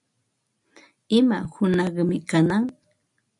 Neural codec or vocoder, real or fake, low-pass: none; real; 10.8 kHz